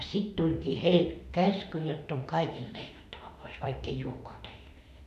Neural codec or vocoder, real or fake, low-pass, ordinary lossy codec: autoencoder, 48 kHz, 32 numbers a frame, DAC-VAE, trained on Japanese speech; fake; 14.4 kHz; none